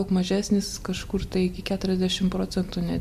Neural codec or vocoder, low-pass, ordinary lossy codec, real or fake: none; 14.4 kHz; MP3, 64 kbps; real